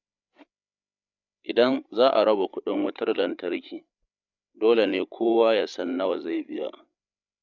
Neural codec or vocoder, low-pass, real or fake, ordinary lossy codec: codec, 16 kHz, 8 kbps, FreqCodec, larger model; 7.2 kHz; fake; none